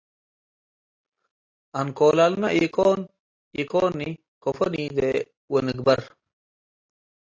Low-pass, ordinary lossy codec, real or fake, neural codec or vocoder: 7.2 kHz; AAC, 32 kbps; real; none